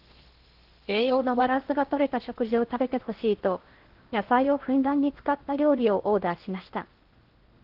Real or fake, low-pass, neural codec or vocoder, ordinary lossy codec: fake; 5.4 kHz; codec, 16 kHz in and 24 kHz out, 0.6 kbps, FocalCodec, streaming, 4096 codes; Opus, 16 kbps